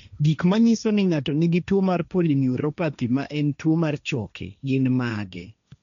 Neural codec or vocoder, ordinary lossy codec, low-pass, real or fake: codec, 16 kHz, 1.1 kbps, Voila-Tokenizer; none; 7.2 kHz; fake